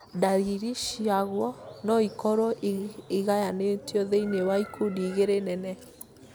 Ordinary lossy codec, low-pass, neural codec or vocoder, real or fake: none; none; none; real